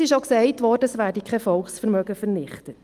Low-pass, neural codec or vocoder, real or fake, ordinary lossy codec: 14.4 kHz; none; real; Opus, 32 kbps